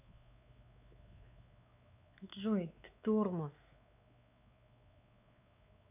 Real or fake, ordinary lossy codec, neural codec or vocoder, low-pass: fake; none; codec, 16 kHz, 4 kbps, X-Codec, WavLM features, trained on Multilingual LibriSpeech; 3.6 kHz